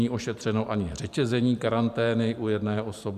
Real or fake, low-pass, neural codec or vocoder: fake; 14.4 kHz; vocoder, 44.1 kHz, 128 mel bands every 512 samples, BigVGAN v2